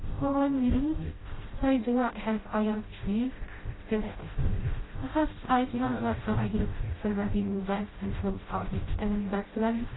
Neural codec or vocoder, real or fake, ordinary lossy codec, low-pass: codec, 16 kHz, 0.5 kbps, FreqCodec, smaller model; fake; AAC, 16 kbps; 7.2 kHz